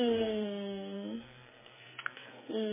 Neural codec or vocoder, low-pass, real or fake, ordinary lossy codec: codec, 44.1 kHz, 2.6 kbps, SNAC; 3.6 kHz; fake; MP3, 16 kbps